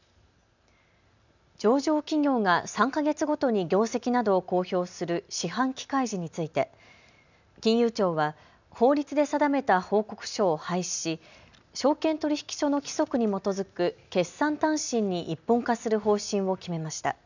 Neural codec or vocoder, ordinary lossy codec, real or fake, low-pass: none; none; real; 7.2 kHz